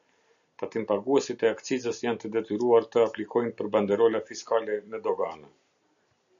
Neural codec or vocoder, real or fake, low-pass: none; real; 7.2 kHz